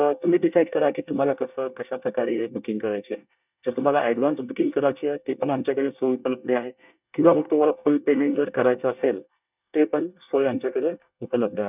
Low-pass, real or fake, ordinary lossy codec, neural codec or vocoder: 3.6 kHz; fake; none; codec, 24 kHz, 1 kbps, SNAC